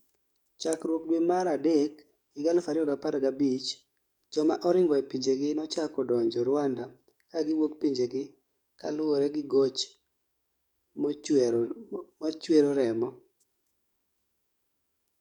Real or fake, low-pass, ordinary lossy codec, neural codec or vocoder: fake; 19.8 kHz; none; codec, 44.1 kHz, 7.8 kbps, DAC